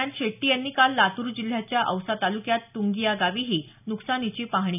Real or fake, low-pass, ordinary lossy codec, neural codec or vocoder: real; 3.6 kHz; none; none